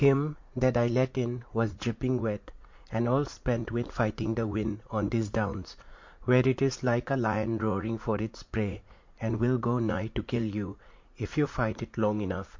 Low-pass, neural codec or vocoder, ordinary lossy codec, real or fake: 7.2 kHz; vocoder, 44.1 kHz, 80 mel bands, Vocos; MP3, 48 kbps; fake